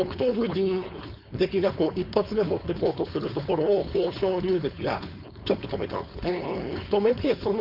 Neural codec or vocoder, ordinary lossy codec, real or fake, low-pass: codec, 16 kHz, 4.8 kbps, FACodec; none; fake; 5.4 kHz